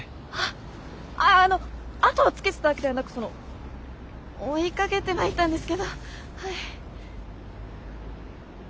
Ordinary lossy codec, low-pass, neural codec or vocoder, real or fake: none; none; none; real